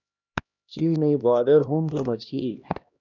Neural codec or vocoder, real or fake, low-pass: codec, 16 kHz, 1 kbps, X-Codec, HuBERT features, trained on LibriSpeech; fake; 7.2 kHz